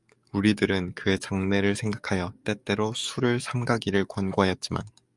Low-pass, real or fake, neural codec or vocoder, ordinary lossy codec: 10.8 kHz; fake; codec, 44.1 kHz, 7.8 kbps, DAC; Opus, 64 kbps